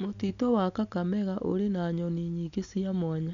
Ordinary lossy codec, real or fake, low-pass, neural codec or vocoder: none; real; 7.2 kHz; none